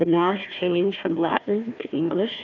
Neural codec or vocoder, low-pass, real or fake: codec, 16 kHz, 1 kbps, FunCodec, trained on Chinese and English, 50 frames a second; 7.2 kHz; fake